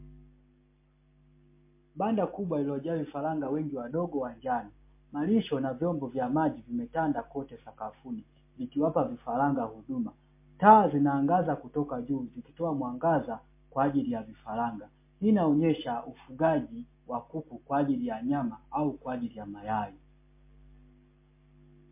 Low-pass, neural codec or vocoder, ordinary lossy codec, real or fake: 3.6 kHz; none; MP3, 24 kbps; real